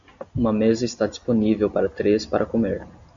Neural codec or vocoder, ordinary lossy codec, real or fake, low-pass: none; MP3, 64 kbps; real; 7.2 kHz